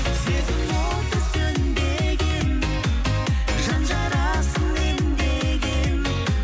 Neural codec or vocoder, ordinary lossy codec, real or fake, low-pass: none; none; real; none